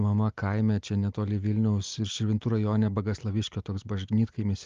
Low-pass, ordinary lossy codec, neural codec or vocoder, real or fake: 7.2 kHz; Opus, 32 kbps; none; real